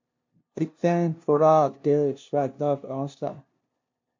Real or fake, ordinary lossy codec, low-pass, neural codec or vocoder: fake; MP3, 48 kbps; 7.2 kHz; codec, 16 kHz, 0.5 kbps, FunCodec, trained on LibriTTS, 25 frames a second